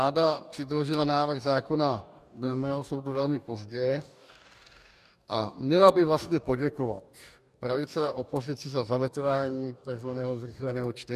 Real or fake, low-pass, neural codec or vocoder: fake; 14.4 kHz; codec, 44.1 kHz, 2.6 kbps, DAC